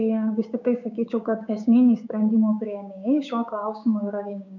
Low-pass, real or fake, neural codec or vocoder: 7.2 kHz; fake; codec, 16 kHz, 6 kbps, DAC